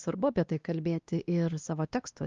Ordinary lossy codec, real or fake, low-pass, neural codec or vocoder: Opus, 32 kbps; fake; 7.2 kHz; codec, 16 kHz, 1 kbps, X-Codec, WavLM features, trained on Multilingual LibriSpeech